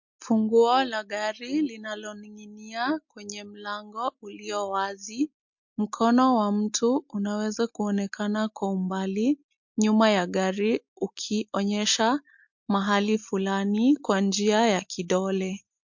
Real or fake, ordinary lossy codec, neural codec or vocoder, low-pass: real; MP3, 48 kbps; none; 7.2 kHz